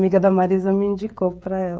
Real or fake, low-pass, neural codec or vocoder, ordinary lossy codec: fake; none; codec, 16 kHz, 16 kbps, FreqCodec, smaller model; none